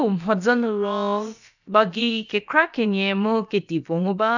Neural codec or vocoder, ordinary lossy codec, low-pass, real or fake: codec, 16 kHz, about 1 kbps, DyCAST, with the encoder's durations; none; 7.2 kHz; fake